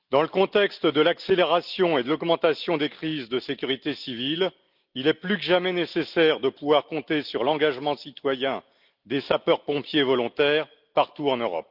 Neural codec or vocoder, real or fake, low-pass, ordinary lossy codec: none; real; 5.4 kHz; Opus, 24 kbps